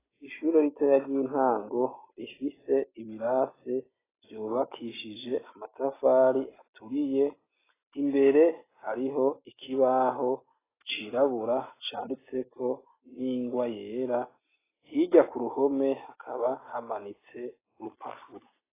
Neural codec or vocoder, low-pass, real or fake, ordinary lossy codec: none; 3.6 kHz; real; AAC, 16 kbps